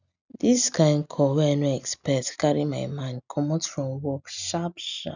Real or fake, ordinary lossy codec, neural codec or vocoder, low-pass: real; none; none; 7.2 kHz